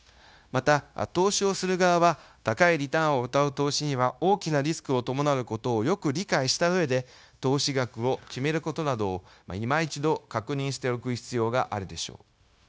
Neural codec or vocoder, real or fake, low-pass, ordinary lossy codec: codec, 16 kHz, 0.9 kbps, LongCat-Audio-Codec; fake; none; none